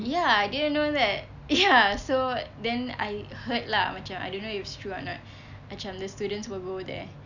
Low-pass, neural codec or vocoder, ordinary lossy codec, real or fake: 7.2 kHz; none; none; real